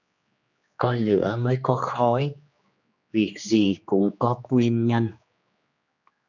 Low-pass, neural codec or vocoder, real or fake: 7.2 kHz; codec, 16 kHz, 2 kbps, X-Codec, HuBERT features, trained on general audio; fake